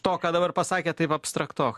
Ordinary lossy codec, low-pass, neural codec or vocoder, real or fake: MP3, 64 kbps; 14.4 kHz; none; real